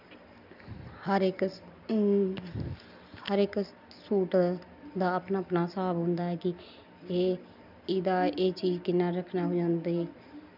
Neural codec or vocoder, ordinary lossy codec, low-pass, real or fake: none; none; 5.4 kHz; real